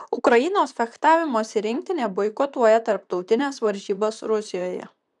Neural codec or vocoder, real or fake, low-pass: vocoder, 44.1 kHz, 128 mel bands, Pupu-Vocoder; fake; 10.8 kHz